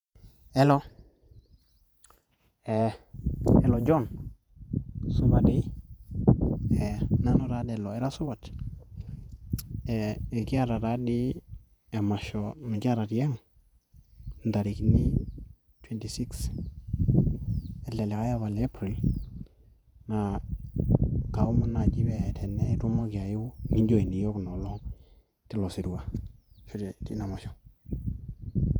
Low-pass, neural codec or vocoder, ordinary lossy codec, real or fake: 19.8 kHz; vocoder, 48 kHz, 128 mel bands, Vocos; none; fake